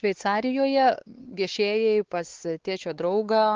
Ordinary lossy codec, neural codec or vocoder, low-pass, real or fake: Opus, 16 kbps; codec, 16 kHz, 4 kbps, X-Codec, WavLM features, trained on Multilingual LibriSpeech; 7.2 kHz; fake